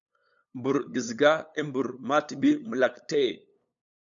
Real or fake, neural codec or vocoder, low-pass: fake; codec, 16 kHz, 8 kbps, FunCodec, trained on LibriTTS, 25 frames a second; 7.2 kHz